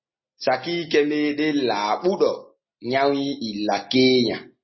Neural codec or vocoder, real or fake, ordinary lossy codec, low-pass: none; real; MP3, 24 kbps; 7.2 kHz